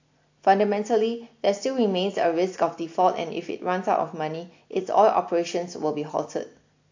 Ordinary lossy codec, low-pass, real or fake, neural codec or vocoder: AAC, 48 kbps; 7.2 kHz; real; none